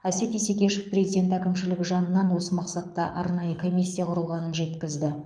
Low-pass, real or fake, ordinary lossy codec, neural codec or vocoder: 9.9 kHz; fake; none; codec, 24 kHz, 6 kbps, HILCodec